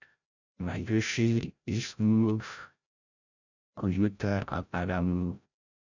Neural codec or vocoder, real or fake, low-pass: codec, 16 kHz, 0.5 kbps, FreqCodec, larger model; fake; 7.2 kHz